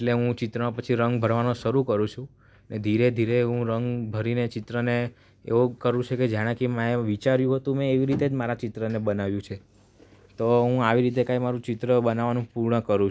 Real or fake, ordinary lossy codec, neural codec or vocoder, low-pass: real; none; none; none